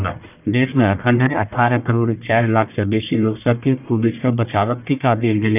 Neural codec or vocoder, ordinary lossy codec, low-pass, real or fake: codec, 44.1 kHz, 1.7 kbps, Pupu-Codec; none; 3.6 kHz; fake